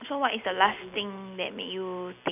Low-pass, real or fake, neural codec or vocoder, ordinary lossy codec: 3.6 kHz; real; none; none